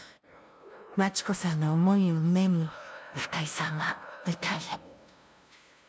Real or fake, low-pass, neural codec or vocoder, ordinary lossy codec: fake; none; codec, 16 kHz, 0.5 kbps, FunCodec, trained on LibriTTS, 25 frames a second; none